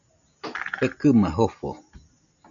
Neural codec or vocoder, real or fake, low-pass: none; real; 7.2 kHz